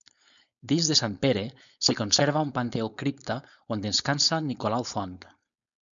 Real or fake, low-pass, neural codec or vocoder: fake; 7.2 kHz; codec, 16 kHz, 4.8 kbps, FACodec